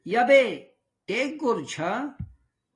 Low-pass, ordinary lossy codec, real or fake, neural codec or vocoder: 10.8 kHz; AAC, 32 kbps; real; none